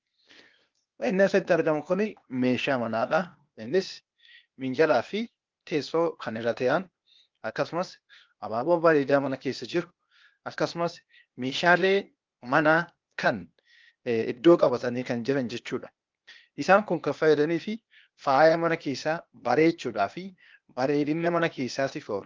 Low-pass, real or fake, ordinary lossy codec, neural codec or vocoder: 7.2 kHz; fake; Opus, 24 kbps; codec, 16 kHz, 0.8 kbps, ZipCodec